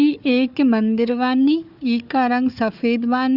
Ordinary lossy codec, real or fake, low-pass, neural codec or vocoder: Opus, 64 kbps; fake; 5.4 kHz; codec, 16 kHz, 4 kbps, FunCodec, trained on Chinese and English, 50 frames a second